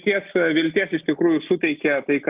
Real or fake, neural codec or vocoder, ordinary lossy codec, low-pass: real; none; Opus, 24 kbps; 3.6 kHz